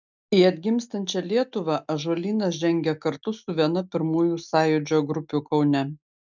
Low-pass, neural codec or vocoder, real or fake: 7.2 kHz; none; real